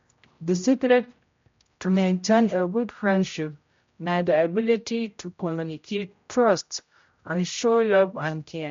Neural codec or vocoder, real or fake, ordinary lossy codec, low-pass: codec, 16 kHz, 0.5 kbps, X-Codec, HuBERT features, trained on general audio; fake; MP3, 48 kbps; 7.2 kHz